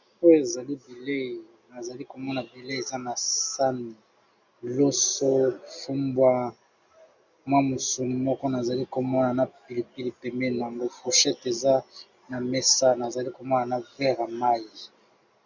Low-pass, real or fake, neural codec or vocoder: 7.2 kHz; real; none